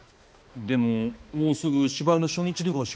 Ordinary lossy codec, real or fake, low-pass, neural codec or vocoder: none; fake; none; codec, 16 kHz, 2 kbps, X-Codec, HuBERT features, trained on balanced general audio